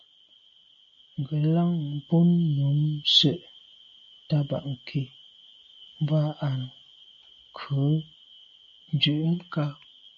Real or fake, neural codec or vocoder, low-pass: real; none; 7.2 kHz